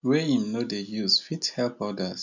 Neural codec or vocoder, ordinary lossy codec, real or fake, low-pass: none; none; real; 7.2 kHz